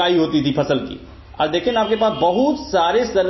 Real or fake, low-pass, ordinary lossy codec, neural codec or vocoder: real; 7.2 kHz; MP3, 24 kbps; none